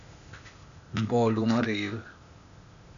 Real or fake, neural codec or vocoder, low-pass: fake; codec, 16 kHz, 0.8 kbps, ZipCodec; 7.2 kHz